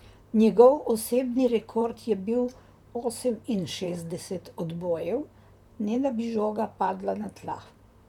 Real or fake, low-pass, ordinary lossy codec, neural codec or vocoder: fake; 19.8 kHz; none; vocoder, 44.1 kHz, 128 mel bands, Pupu-Vocoder